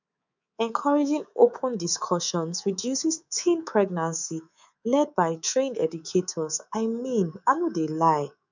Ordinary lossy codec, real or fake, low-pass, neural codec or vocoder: none; fake; 7.2 kHz; codec, 24 kHz, 3.1 kbps, DualCodec